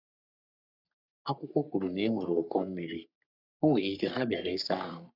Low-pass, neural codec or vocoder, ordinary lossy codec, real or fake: 5.4 kHz; codec, 44.1 kHz, 3.4 kbps, Pupu-Codec; none; fake